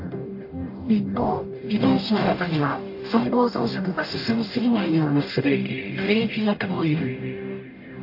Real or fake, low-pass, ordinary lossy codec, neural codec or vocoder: fake; 5.4 kHz; AAC, 32 kbps; codec, 44.1 kHz, 0.9 kbps, DAC